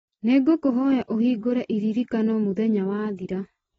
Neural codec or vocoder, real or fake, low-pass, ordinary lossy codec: none; real; 19.8 kHz; AAC, 24 kbps